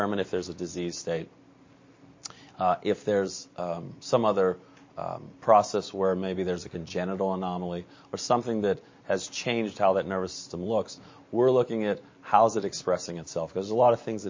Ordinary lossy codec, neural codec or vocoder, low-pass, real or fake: MP3, 32 kbps; none; 7.2 kHz; real